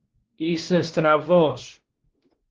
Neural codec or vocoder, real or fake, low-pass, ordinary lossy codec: codec, 16 kHz, 1 kbps, X-Codec, WavLM features, trained on Multilingual LibriSpeech; fake; 7.2 kHz; Opus, 16 kbps